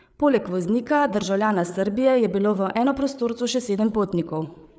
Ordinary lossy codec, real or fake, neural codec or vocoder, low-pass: none; fake; codec, 16 kHz, 8 kbps, FreqCodec, larger model; none